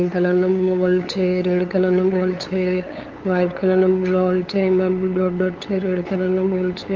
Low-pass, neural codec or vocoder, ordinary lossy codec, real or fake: 7.2 kHz; codec, 16 kHz, 8 kbps, FunCodec, trained on LibriTTS, 25 frames a second; Opus, 32 kbps; fake